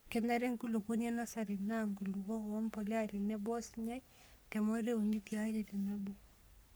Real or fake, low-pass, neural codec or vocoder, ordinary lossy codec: fake; none; codec, 44.1 kHz, 3.4 kbps, Pupu-Codec; none